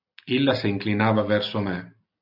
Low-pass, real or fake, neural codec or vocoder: 5.4 kHz; real; none